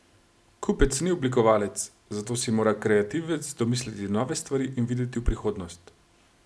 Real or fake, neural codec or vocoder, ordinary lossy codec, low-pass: real; none; none; none